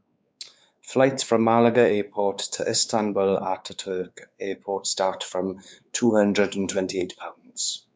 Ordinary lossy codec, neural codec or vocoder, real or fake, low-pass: none; codec, 16 kHz, 4 kbps, X-Codec, WavLM features, trained on Multilingual LibriSpeech; fake; none